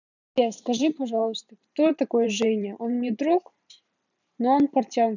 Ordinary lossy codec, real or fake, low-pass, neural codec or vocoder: none; fake; 7.2 kHz; vocoder, 44.1 kHz, 128 mel bands every 512 samples, BigVGAN v2